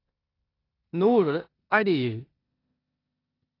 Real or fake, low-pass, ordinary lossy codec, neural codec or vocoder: fake; 5.4 kHz; AAC, 24 kbps; codec, 16 kHz in and 24 kHz out, 0.9 kbps, LongCat-Audio-Codec, four codebook decoder